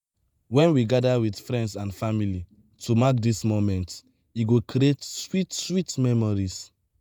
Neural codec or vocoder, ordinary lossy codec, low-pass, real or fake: vocoder, 48 kHz, 128 mel bands, Vocos; none; none; fake